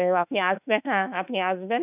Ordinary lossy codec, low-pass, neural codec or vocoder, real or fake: none; 3.6 kHz; autoencoder, 48 kHz, 32 numbers a frame, DAC-VAE, trained on Japanese speech; fake